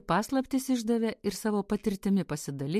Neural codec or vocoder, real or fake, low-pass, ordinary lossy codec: none; real; 14.4 kHz; MP3, 64 kbps